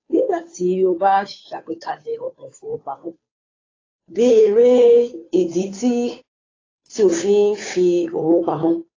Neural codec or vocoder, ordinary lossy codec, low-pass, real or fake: codec, 16 kHz, 2 kbps, FunCodec, trained on Chinese and English, 25 frames a second; AAC, 32 kbps; 7.2 kHz; fake